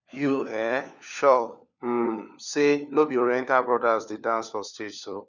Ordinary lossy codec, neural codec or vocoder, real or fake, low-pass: none; codec, 16 kHz, 4 kbps, FunCodec, trained on LibriTTS, 50 frames a second; fake; 7.2 kHz